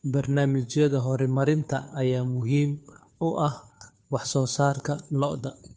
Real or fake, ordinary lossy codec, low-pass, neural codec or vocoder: fake; none; none; codec, 16 kHz, 2 kbps, FunCodec, trained on Chinese and English, 25 frames a second